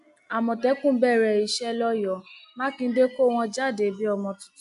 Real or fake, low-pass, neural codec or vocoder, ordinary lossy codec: real; 10.8 kHz; none; none